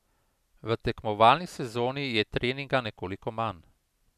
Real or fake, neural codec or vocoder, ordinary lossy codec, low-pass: real; none; none; 14.4 kHz